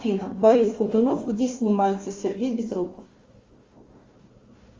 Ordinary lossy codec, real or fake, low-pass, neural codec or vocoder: Opus, 32 kbps; fake; 7.2 kHz; codec, 16 kHz, 1 kbps, FunCodec, trained on Chinese and English, 50 frames a second